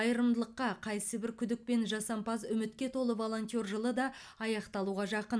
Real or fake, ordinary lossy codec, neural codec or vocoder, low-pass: real; none; none; none